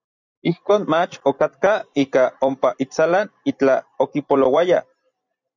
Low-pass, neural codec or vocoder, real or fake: 7.2 kHz; vocoder, 44.1 kHz, 128 mel bands every 256 samples, BigVGAN v2; fake